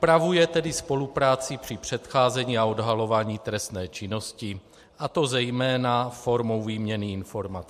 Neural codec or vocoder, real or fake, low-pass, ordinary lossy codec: none; real; 14.4 kHz; MP3, 64 kbps